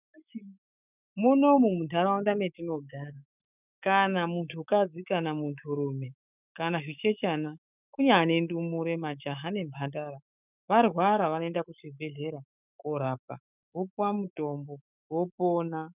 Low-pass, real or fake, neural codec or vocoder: 3.6 kHz; fake; autoencoder, 48 kHz, 128 numbers a frame, DAC-VAE, trained on Japanese speech